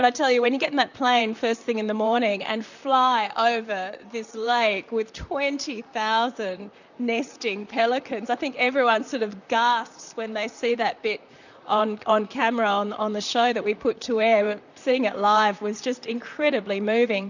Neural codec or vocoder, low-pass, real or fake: vocoder, 44.1 kHz, 128 mel bands, Pupu-Vocoder; 7.2 kHz; fake